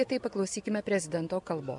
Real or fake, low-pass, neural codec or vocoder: real; 10.8 kHz; none